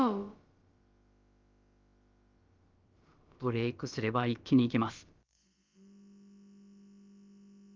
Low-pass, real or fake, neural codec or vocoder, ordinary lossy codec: 7.2 kHz; fake; codec, 16 kHz, about 1 kbps, DyCAST, with the encoder's durations; Opus, 32 kbps